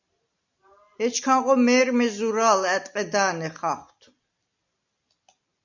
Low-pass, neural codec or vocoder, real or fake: 7.2 kHz; none; real